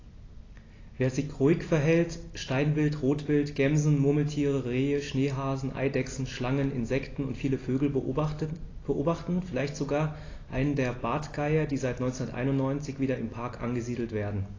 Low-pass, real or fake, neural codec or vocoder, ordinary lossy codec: 7.2 kHz; real; none; AAC, 32 kbps